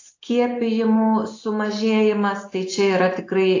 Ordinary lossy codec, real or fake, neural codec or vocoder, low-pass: AAC, 48 kbps; real; none; 7.2 kHz